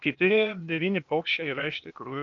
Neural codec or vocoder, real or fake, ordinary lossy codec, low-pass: codec, 16 kHz, 0.8 kbps, ZipCodec; fake; MP3, 64 kbps; 7.2 kHz